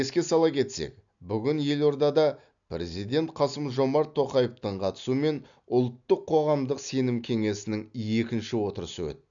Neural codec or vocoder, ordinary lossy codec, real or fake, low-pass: none; none; real; 7.2 kHz